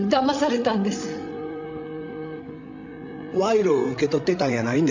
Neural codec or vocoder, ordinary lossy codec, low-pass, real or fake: codec, 16 kHz, 16 kbps, FreqCodec, larger model; MP3, 48 kbps; 7.2 kHz; fake